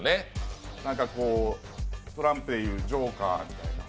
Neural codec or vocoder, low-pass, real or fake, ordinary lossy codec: none; none; real; none